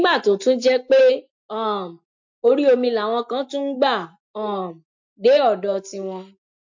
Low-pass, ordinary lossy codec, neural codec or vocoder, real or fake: 7.2 kHz; MP3, 48 kbps; none; real